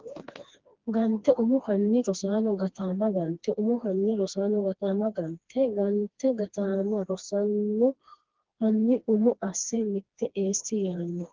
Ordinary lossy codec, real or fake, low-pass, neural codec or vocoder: Opus, 16 kbps; fake; 7.2 kHz; codec, 16 kHz, 2 kbps, FreqCodec, smaller model